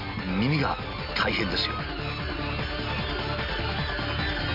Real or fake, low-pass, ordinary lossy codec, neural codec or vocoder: real; 5.4 kHz; MP3, 48 kbps; none